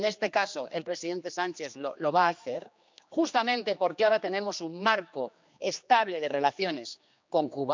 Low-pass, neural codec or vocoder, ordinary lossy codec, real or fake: 7.2 kHz; codec, 16 kHz, 2 kbps, X-Codec, HuBERT features, trained on general audio; MP3, 64 kbps; fake